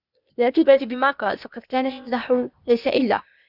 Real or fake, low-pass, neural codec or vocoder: fake; 5.4 kHz; codec, 16 kHz, 0.8 kbps, ZipCodec